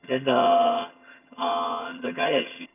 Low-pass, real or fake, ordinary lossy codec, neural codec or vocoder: 3.6 kHz; fake; none; vocoder, 22.05 kHz, 80 mel bands, HiFi-GAN